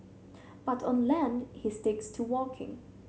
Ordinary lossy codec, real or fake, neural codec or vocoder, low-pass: none; real; none; none